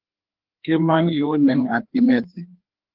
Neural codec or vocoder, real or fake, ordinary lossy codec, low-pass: codec, 16 kHz, 2 kbps, FreqCodec, larger model; fake; Opus, 16 kbps; 5.4 kHz